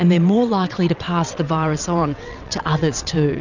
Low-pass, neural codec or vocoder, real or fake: 7.2 kHz; vocoder, 22.05 kHz, 80 mel bands, WaveNeXt; fake